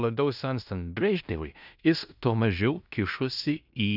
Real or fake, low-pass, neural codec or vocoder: fake; 5.4 kHz; codec, 16 kHz in and 24 kHz out, 0.9 kbps, LongCat-Audio-Codec, fine tuned four codebook decoder